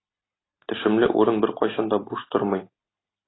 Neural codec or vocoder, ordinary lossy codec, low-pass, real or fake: none; AAC, 16 kbps; 7.2 kHz; real